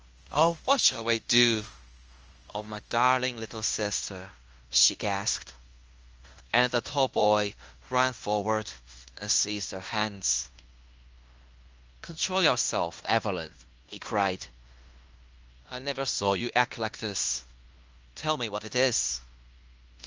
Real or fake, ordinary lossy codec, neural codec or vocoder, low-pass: fake; Opus, 24 kbps; codec, 16 kHz in and 24 kHz out, 0.9 kbps, LongCat-Audio-Codec, fine tuned four codebook decoder; 7.2 kHz